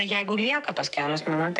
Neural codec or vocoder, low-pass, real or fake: codec, 44.1 kHz, 2.6 kbps, SNAC; 10.8 kHz; fake